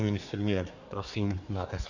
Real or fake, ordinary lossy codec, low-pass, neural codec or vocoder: fake; Opus, 64 kbps; 7.2 kHz; codec, 24 kHz, 1 kbps, SNAC